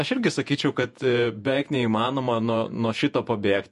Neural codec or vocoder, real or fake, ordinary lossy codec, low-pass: vocoder, 48 kHz, 128 mel bands, Vocos; fake; MP3, 48 kbps; 14.4 kHz